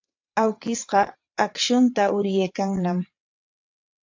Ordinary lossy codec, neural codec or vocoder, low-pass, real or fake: AAC, 48 kbps; vocoder, 22.05 kHz, 80 mel bands, WaveNeXt; 7.2 kHz; fake